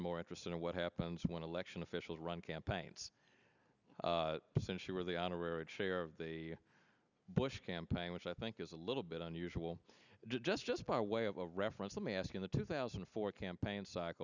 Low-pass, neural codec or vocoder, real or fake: 7.2 kHz; none; real